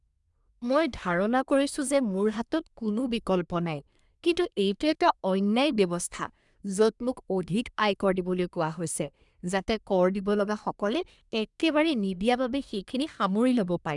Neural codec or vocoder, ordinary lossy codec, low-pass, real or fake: codec, 24 kHz, 1 kbps, SNAC; none; 10.8 kHz; fake